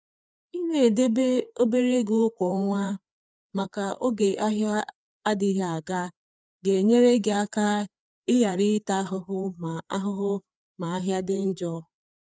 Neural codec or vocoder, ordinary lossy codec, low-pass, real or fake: codec, 16 kHz, 4 kbps, FreqCodec, larger model; none; none; fake